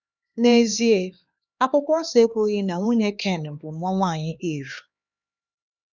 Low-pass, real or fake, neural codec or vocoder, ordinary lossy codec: 7.2 kHz; fake; codec, 16 kHz, 4 kbps, X-Codec, HuBERT features, trained on LibriSpeech; none